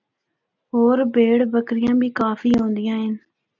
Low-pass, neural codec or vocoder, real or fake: 7.2 kHz; none; real